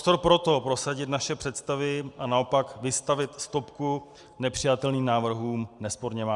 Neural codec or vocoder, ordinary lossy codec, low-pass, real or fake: none; Opus, 64 kbps; 10.8 kHz; real